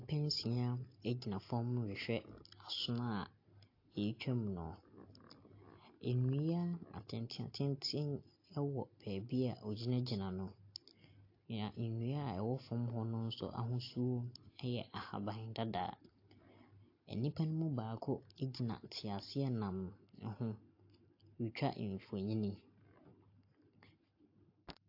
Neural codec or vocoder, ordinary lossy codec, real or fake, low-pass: none; AAC, 32 kbps; real; 5.4 kHz